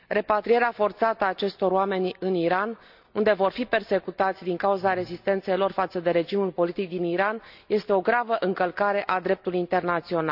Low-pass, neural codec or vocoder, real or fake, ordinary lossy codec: 5.4 kHz; none; real; none